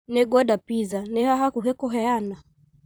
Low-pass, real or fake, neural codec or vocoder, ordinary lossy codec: none; fake; vocoder, 44.1 kHz, 128 mel bands, Pupu-Vocoder; none